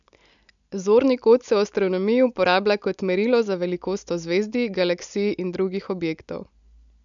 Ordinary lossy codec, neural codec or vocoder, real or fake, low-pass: none; none; real; 7.2 kHz